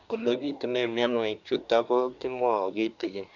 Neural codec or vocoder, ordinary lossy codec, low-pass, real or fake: codec, 24 kHz, 1 kbps, SNAC; none; 7.2 kHz; fake